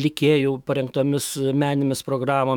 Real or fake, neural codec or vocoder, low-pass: fake; autoencoder, 48 kHz, 128 numbers a frame, DAC-VAE, trained on Japanese speech; 19.8 kHz